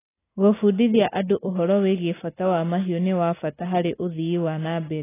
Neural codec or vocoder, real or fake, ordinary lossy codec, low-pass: none; real; AAC, 16 kbps; 3.6 kHz